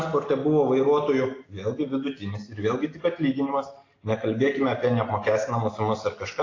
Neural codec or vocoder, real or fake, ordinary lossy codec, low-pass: none; real; AAC, 32 kbps; 7.2 kHz